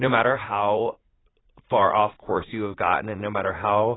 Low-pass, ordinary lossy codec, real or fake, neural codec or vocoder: 7.2 kHz; AAC, 16 kbps; fake; vocoder, 44.1 kHz, 80 mel bands, Vocos